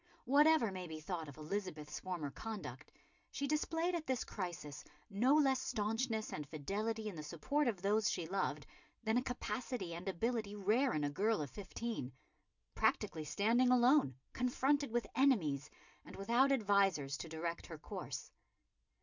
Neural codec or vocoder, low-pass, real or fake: none; 7.2 kHz; real